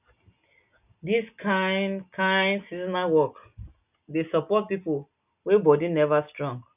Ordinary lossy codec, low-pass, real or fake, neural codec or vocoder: none; 3.6 kHz; real; none